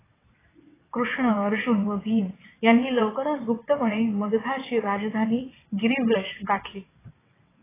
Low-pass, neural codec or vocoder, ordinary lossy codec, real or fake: 3.6 kHz; vocoder, 22.05 kHz, 80 mel bands, WaveNeXt; AAC, 16 kbps; fake